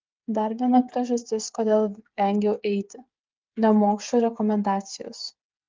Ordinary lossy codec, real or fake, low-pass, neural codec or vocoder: Opus, 24 kbps; fake; 7.2 kHz; codec, 16 kHz, 8 kbps, FreqCodec, smaller model